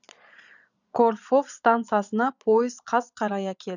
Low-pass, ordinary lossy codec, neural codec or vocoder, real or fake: 7.2 kHz; none; vocoder, 22.05 kHz, 80 mel bands, Vocos; fake